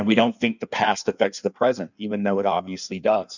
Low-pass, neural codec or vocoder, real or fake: 7.2 kHz; codec, 16 kHz in and 24 kHz out, 1.1 kbps, FireRedTTS-2 codec; fake